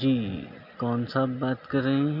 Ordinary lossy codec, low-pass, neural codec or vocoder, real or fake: none; 5.4 kHz; none; real